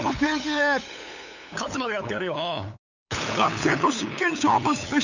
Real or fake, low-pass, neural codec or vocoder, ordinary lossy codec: fake; 7.2 kHz; codec, 16 kHz, 8 kbps, FunCodec, trained on LibriTTS, 25 frames a second; none